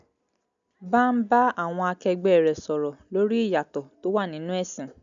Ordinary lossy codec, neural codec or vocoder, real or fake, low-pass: none; none; real; 7.2 kHz